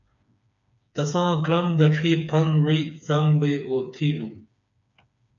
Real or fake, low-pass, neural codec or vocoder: fake; 7.2 kHz; codec, 16 kHz, 4 kbps, FreqCodec, smaller model